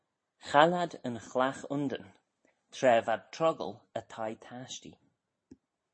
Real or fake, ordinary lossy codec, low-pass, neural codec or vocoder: real; MP3, 32 kbps; 9.9 kHz; none